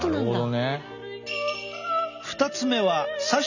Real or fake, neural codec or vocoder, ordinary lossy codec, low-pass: real; none; none; 7.2 kHz